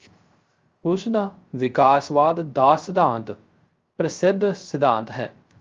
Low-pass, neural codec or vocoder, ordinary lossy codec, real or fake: 7.2 kHz; codec, 16 kHz, 0.3 kbps, FocalCodec; Opus, 32 kbps; fake